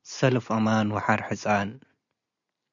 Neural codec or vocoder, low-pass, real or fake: none; 7.2 kHz; real